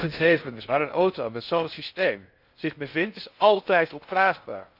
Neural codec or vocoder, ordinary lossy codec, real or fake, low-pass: codec, 16 kHz in and 24 kHz out, 0.6 kbps, FocalCodec, streaming, 2048 codes; Opus, 64 kbps; fake; 5.4 kHz